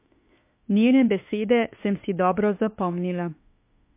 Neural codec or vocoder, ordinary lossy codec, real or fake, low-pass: autoencoder, 48 kHz, 32 numbers a frame, DAC-VAE, trained on Japanese speech; MP3, 24 kbps; fake; 3.6 kHz